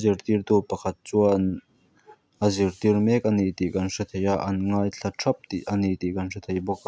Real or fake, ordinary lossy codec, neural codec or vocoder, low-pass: real; none; none; none